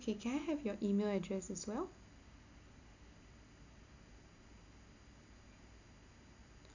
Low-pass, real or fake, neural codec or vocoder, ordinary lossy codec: 7.2 kHz; real; none; none